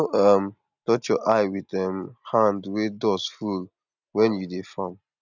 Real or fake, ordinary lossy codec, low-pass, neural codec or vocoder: real; none; 7.2 kHz; none